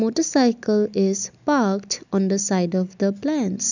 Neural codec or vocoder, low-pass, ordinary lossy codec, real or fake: none; 7.2 kHz; none; real